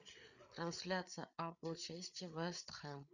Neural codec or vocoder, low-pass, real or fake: codec, 16 kHz, 8 kbps, FreqCodec, larger model; 7.2 kHz; fake